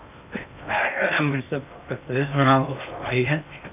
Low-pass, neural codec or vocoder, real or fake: 3.6 kHz; codec, 16 kHz in and 24 kHz out, 0.6 kbps, FocalCodec, streaming, 2048 codes; fake